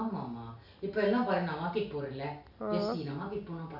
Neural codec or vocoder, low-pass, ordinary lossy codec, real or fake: none; 5.4 kHz; none; real